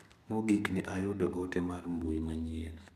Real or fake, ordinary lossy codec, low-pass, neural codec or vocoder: fake; MP3, 96 kbps; 14.4 kHz; codec, 32 kHz, 1.9 kbps, SNAC